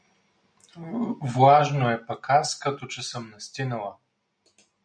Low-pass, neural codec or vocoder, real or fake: 9.9 kHz; none; real